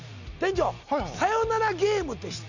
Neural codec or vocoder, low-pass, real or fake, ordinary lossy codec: none; 7.2 kHz; real; none